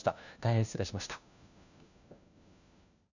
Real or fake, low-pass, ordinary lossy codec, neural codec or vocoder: fake; 7.2 kHz; none; codec, 16 kHz, 1 kbps, FunCodec, trained on LibriTTS, 50 frames a second